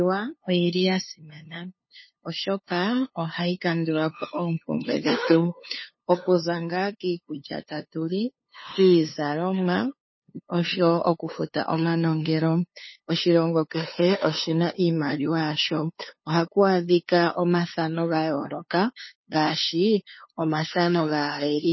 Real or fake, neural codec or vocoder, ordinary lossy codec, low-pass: fake; codec, 16 kHz, 2 kbps, FunCodec, trained on LibriTTS, 25 frames a second; MP3, 24 kbps; 7.2 kHz